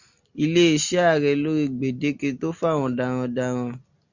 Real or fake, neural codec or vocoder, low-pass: real; none; 7.2 kHz